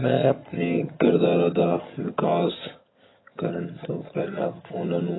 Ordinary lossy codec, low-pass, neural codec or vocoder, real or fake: AAC, 16 kbps; 7.2 kHz; vocoder, 22.05 kHz, 80 mel bands, HiFi-GAN; fake